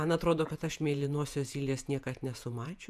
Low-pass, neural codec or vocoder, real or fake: 14.4 kHz; vocoder, 48 kHz, 128 mel bands, Vocos; fake